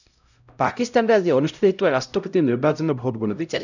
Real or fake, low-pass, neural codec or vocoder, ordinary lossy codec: fake; 7.2 kHz; codec, 16 kHz, 0.5 kbps, X-Codec, HuBERT features, trained on LibriSpeech; none